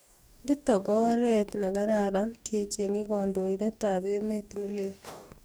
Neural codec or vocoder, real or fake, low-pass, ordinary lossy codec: codec, 44.1 kHz, 2.6 kbps, DAC; fake; none; none